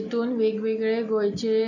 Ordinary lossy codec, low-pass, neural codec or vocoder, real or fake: none; 7.2 kHz; none; real